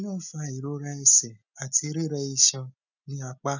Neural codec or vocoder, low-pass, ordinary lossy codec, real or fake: none; 7.2 kHz; none; real